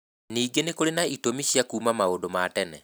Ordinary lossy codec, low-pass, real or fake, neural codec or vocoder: none; none; real; none